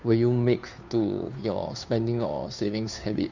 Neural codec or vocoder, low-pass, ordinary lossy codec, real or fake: codec, 16 kHz, 2 kbps, FunCodec, trained on Chinese and English, 25 frames a second; 7.2 kHz; none; fake